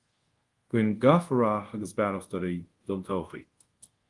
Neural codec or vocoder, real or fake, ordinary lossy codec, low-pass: codec, 24 kHz, 0.5 kbps, DualCodec; fake; Opus, 24 kbps; 10.8 kHz